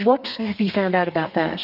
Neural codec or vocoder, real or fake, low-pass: codec, 32 kHz, 1.9 kbps, SNAC; fake; 5.4 kHz